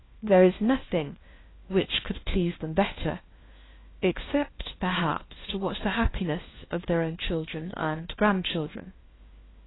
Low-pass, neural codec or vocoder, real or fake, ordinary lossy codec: 7.2 kHz; codec, 16 kHz, 1 kbps, FunCodec, trained on LibriTTS, 50 frames a second; fake; AAC, 16 kbps